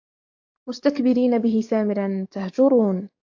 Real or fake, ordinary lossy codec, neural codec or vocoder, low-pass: fake; AAC, 48 kbps; codec, 44.1 kHz, 7.8 kbps, DAC; 7.2 kHz